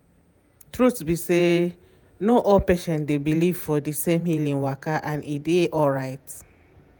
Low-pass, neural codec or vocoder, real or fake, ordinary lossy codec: none; vocoder, 48 kHz, 128 mel bands, Vocos; fake; none